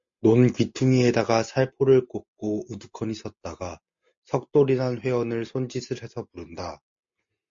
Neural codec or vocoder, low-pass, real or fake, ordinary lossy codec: none; 7.2 kHz; real; MP3, 48 kbps